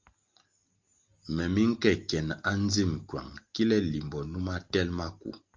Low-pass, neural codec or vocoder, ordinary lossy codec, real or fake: 7.2 kHz; none; Opus, 32 kbps; real